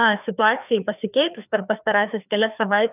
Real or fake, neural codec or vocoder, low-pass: fake; codec, 16 kHz, 2 kbps, FreqCodec, larger model; 3.6 kHz